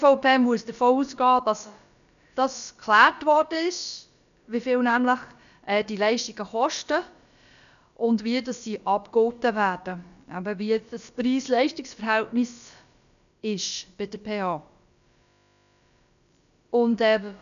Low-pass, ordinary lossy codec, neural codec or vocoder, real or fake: 7.2 kHz; none; codec, 16 kHz, about 1 kbps, DyCAST, with the encoder's durations; fake